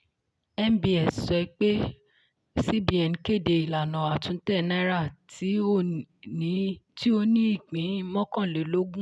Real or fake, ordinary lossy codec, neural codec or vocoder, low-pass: real; none; none; none